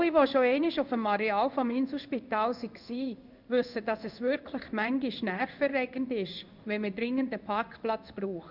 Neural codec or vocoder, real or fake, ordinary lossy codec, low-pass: codec, 16 kHz in and 24 kHz out, 1 kbps, XY-Tokenizer; fake; none; 5.4 kHz